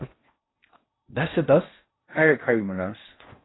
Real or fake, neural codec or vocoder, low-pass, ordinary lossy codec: fake; codec, 16 kHz in and 24 kHz out, 0.6 kbps, FocalCodec, streaming, 4096 codes; 7.2 kHz; AAC, 16 kbps